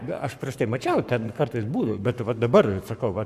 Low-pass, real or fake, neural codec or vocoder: 14.4 kHz; fake; codec, 44.1 kHz, 7.8 kbps, Pupu-Codec